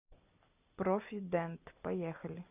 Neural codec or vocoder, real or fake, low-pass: none; real; 3.6 kHz